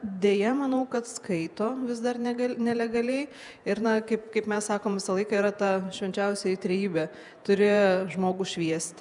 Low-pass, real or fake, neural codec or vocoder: 10.8 kHz; fake; vocoder, 48 kHz, 128 mel bands, Vocos